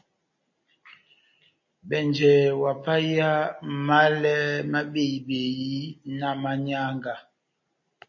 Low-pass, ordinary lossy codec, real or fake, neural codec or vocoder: 7.2 kHz; MP3, 32 kbps; real; none